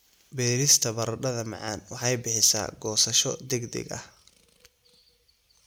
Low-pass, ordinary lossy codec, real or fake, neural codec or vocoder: none; none; real; none